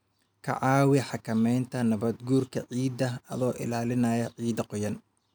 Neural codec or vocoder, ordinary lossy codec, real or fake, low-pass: none; none; real; none